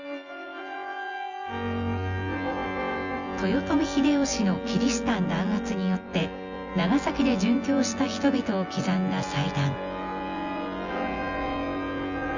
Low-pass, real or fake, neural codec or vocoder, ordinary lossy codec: 7.2 kHz; fake; vocoder, 24 kHz, 100 mel bands, Vocos; Opus, 64 kbps